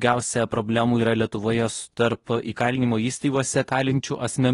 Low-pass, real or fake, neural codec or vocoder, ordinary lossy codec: 10.8 kHz; fake; codec, 24 kHz, 0.9 kbps, WavTokenizer, medium speech release version 2; AAC, 32 kbps